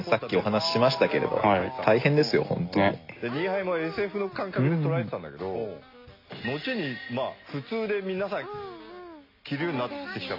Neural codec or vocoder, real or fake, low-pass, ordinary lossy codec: none; real; 5.4 kHz; AAC, 32 kbps